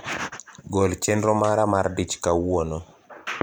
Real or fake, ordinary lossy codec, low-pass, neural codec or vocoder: fake; none; none; vocoder, 44.1 kHz, 128 mel bands every 512 samples, BigVGAN v2